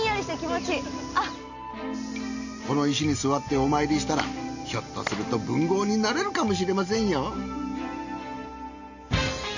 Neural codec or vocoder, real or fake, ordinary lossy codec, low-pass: none; real; none; 7.2 kHz